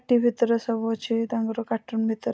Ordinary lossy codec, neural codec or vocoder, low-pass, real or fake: none; none; none; real